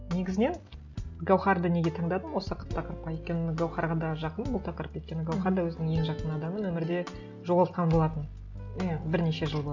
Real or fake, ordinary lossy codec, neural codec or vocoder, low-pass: real; none; none; 7.2 kHz